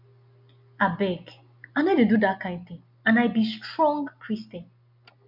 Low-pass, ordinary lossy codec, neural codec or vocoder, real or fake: 5.4 kHz; MP3, 48 kbps; none; real